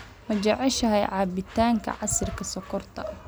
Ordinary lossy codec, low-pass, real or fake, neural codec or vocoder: none; none; real; none